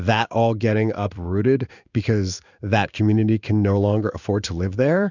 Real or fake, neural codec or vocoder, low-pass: fake; codec, 16 kHz in and 24 kHz out, 1 kbps, XY-Tokenizer; 7.2 kHz